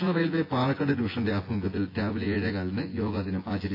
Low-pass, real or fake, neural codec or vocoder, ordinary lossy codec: 5.4 kHz; fake; vocoder, 24 kHz, 100 mel bands, Vocos; AAC, 32 kbps